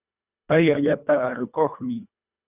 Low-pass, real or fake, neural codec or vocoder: 3.6 kHz; fake; codec, 24 kHz, 1.5 kbps, HILCodec